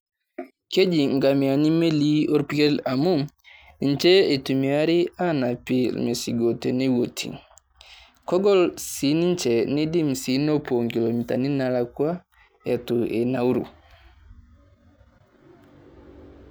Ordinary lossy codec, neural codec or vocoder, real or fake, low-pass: none; none; real; none